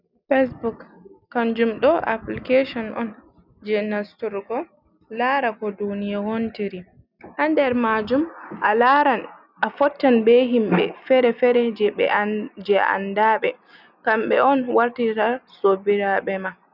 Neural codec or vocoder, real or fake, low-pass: none; real; 5.4 kHz